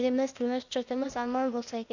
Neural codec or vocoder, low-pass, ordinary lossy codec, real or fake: codec, 16 kHz, 0.8 kbps, ZipCodec; 7.2 kHz; none; fake